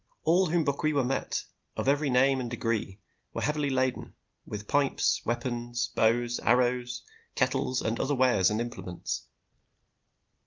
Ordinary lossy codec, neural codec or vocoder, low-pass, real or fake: Opus, 24 kbps; none; 7.2 kHz; real